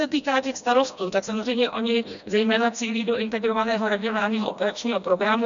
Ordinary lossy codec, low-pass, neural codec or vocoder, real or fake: MP3, 96 kbps; 7.2 kHz; codec, 16 kHz, 1 kbps, FreqCodec, smaller model; fake